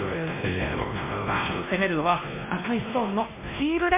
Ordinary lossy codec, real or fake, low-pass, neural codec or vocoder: MP3, 32 kbps; fake; 3.6 kHz; codec, 16 kHz, 1 kbps, X-Codec, WavLM features, trained on Multilingual LibriSpeech